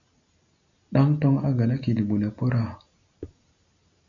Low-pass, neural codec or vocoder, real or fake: 7.2 kHz; none; real